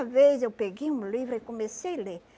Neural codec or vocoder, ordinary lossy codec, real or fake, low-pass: none; none; real; none